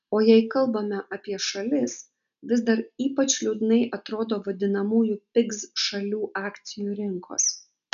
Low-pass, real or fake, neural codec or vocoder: 7.2 kHz; real; none